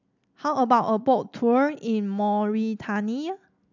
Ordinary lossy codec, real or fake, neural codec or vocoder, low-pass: none; real; none; 7.2 kHz